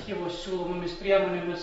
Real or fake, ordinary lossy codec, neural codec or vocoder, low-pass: real; AAC, 24 kbps; none; 9.9 kHz